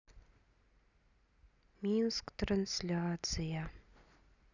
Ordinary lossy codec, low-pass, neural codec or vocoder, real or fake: none; 7.2 kHz; none; real